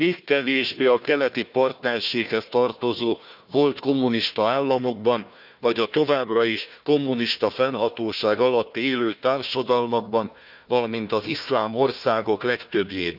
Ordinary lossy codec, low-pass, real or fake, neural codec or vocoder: none; 5.4 kHz; fake; codec, 16 kHz, 1 kbps, FunCodec, trained on Chinese and English, 50 frames a second